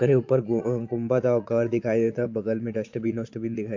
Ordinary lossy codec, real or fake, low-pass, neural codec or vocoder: MP3, 48 kbps; fake; 7.2 kHz; vocoder, 22.05 kHz, 80 mel bands, Vocos